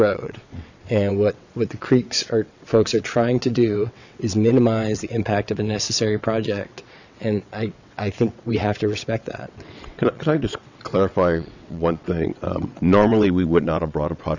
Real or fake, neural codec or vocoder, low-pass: fake; vocoder, 22.05 kHz, 80 mel bands, WaveNeXt; 7.2 kHz